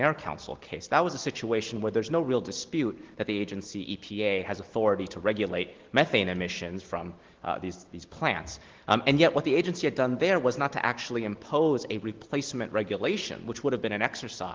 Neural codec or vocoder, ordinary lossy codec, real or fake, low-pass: none; Opus, 16 kbps; real; 7.2 kHz